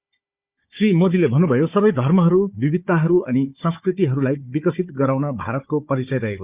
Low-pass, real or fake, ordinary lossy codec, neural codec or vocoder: 3.6 kHz; fake; Opus, 64 kbps; codec, 16 kHz, 4 kbps, FunCodec, trained on Chinese and English, 50 frames a second